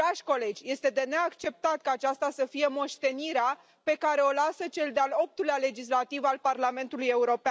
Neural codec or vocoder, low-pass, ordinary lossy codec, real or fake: none; none; none; real